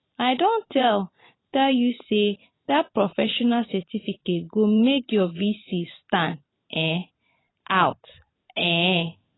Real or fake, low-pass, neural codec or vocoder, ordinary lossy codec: fake; 7.2 kHz; vocoder, 44.1 kHz, 128 mel bands every 512 samples, BigVGAN v2; AAC, 16 kbps